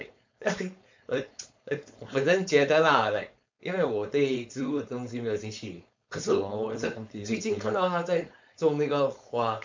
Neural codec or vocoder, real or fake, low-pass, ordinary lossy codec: codec, 16 kHz, 4.8 kbps, FACodec; fake; 7.2 kHz; MP3, 64 kbps